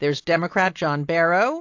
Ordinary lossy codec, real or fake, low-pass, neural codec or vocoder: AAC, 48 kbps; real; 7.2 kHz; none